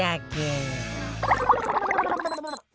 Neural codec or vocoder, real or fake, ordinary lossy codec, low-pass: none; real; none; none